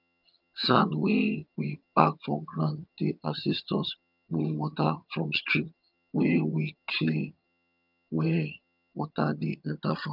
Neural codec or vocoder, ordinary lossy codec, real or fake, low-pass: vocoder, 22.05 kHz, 80 mel bands, HiFi-GAN; none; fake; 5.4 kHz